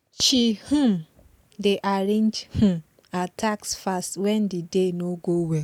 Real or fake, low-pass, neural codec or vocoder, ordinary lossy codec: real; 19.8 kHz; none; none